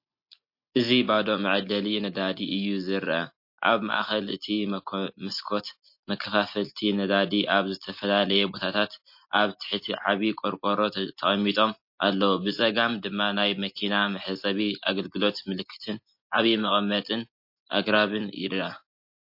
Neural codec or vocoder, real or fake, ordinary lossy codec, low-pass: none; real; MP3, 32 kbps; 5.4 kHz